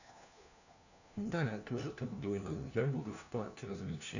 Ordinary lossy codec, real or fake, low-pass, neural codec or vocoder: none; fake; 7.2 kHz; codec, 16 kHz, 1 kbps, FunCodec, trained on LibriTTS, 50 frames a second